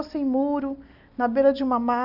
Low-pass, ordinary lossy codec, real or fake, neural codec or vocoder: 5.4 kHz; none; real; none